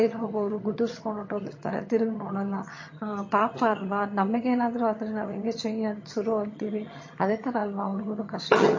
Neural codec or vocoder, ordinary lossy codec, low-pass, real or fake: vocoder, 22.05 kHz, 80 mel bands, HiFi-GAN; MP3, 32 kbps; 7.2 kHz; fake